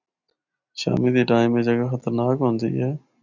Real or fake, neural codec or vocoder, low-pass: real; none; 7.2 kHz